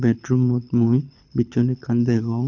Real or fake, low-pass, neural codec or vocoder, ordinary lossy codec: fake; 7.2 kHz; codec, 24 kHz, 6 kbps, HILCodec; none